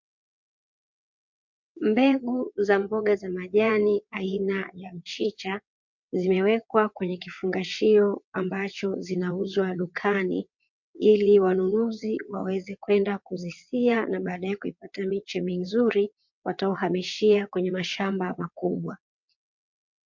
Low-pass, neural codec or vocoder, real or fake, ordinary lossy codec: 7.2 kHz; vocoder, 22.05 kHz, 80 mel bands, WaveNeXt; fake; MP3, 48 kbps